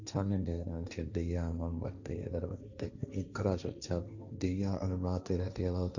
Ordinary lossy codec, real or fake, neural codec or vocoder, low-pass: none; fake; codec, 16 kHz, 1.1 kbps, Voila-Tokenizer; 7.2 kHz